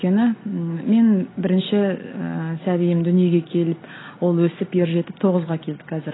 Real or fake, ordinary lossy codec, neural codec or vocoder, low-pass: real; AAC, 16 kbps; none; 7.2 kHz